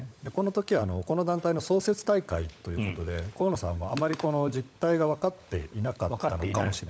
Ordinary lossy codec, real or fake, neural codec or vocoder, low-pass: none; fake; codec, 16 kHz, 16 kbps, FunCodec, trained on LibriTTS, 50 frames a second; none